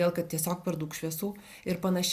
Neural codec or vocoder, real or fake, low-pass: none; real; 14.4 kHz